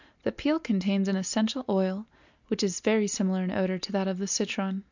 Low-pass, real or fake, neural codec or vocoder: 7.2 kHz; real; none